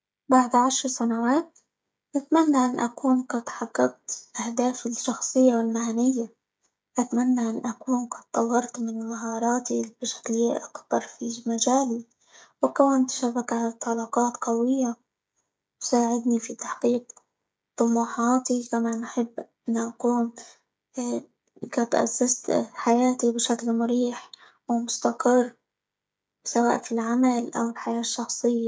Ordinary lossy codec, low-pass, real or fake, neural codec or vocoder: none; none; fake; codec, 16 kHz, 16 kbps, FreqCodec, smaller model